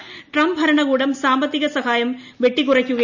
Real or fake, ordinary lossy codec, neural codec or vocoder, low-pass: real; none; none; 7.2 kHz